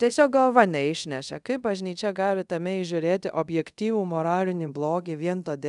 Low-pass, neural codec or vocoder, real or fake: 10.8 kHz; codec, 24 kHz, 0.5 kbps, DualCodec; fake